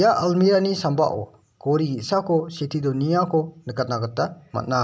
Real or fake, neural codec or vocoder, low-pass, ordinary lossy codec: real; none; none; none